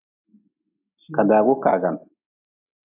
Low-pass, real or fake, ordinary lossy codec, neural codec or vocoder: 3.6 kHz; real; AAC, 32 kbps; none